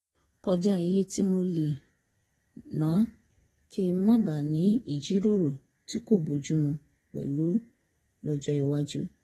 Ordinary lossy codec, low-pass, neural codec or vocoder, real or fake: AAC, 32 kbps; 14.4 kHz; codec, 32 kHz, 1.9 kbps, SNAC; fake